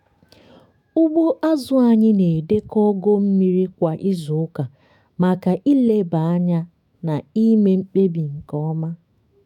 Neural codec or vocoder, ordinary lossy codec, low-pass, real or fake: autoencoder, 48 kHz, 128 numbers a frame, DAC-VAE, trained on Japanese speech; none; 19.8 kHz; fake